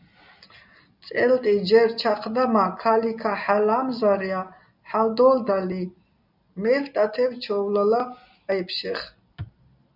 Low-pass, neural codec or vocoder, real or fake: 5.4 kHz; none; real